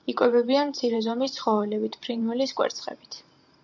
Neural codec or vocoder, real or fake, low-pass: vocoder, 44.1 kHz, 128 mel bands every 512 samples, BigVGAN v2; fake; 7.2 kHz